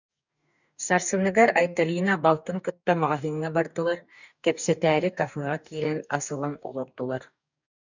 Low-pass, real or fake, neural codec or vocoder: 7.2 kHz; fake; codec, 44.1 kHz, 2.6 kbps, DAC